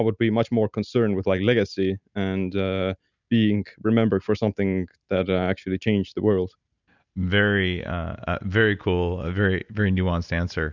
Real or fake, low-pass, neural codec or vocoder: real; 7.2 kHz; none